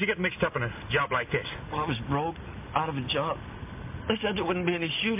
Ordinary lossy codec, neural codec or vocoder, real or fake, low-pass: MP3, 32 kbps; none; real; 3.6 kHz